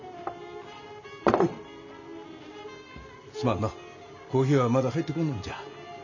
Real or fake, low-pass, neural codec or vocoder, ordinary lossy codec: real; 7.2 kHz; none; none